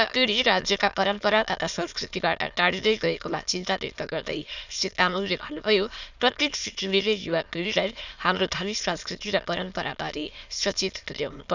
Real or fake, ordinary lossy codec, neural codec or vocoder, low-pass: fake; none; autoencoder, 22.05 kHz, a latent of 192 numbers a frame, VITS, trained on many speakers; 7.2 kHz